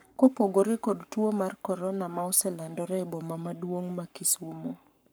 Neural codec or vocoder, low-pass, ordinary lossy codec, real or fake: codec, 44.1 kHz, 7.8 kbps, Pupu-Codec; none; none; fake